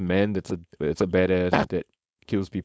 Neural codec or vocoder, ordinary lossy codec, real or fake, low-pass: codec, 16 kHz, 4.8 kbps, FACodec; none; fake; none